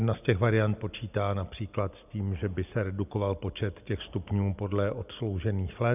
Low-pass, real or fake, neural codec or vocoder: 3.6 kHz; real; none